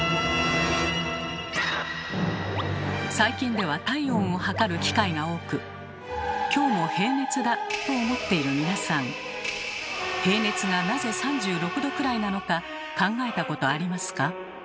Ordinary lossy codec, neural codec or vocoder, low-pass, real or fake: none; none; none; real